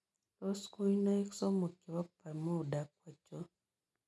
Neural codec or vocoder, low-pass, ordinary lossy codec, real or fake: none; none; none; real